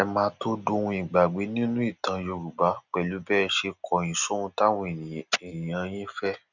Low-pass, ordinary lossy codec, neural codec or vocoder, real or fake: 7.2 kHz; none; none; real